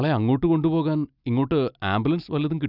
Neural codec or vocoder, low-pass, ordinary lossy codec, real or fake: none; 5.4 kHz; Opus, 64 kbps; real